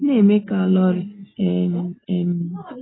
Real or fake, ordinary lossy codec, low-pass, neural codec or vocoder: real; AAC, 16 kbps; 7.2 kHz; none